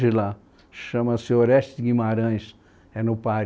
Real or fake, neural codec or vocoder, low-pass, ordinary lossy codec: real; none; none; none